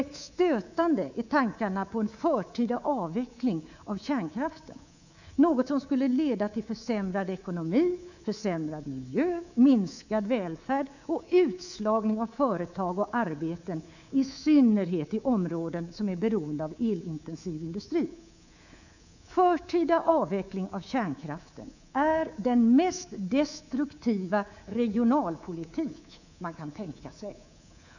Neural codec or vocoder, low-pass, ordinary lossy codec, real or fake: codec, 24 kHz, 3.1 kbps, DualCodec; 7.2 kHz; none; fake